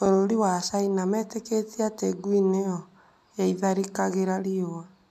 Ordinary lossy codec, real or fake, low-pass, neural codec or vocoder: MP3, 96 kbps; real; 14.4 kHz; none